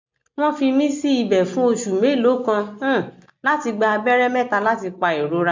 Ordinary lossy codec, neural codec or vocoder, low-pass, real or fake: MP3, 64 kbps; none; 7.2 kHz; real